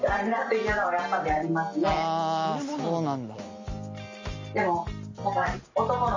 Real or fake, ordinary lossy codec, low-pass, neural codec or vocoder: real; MP3, 32 kbps; 7.2 kHz; none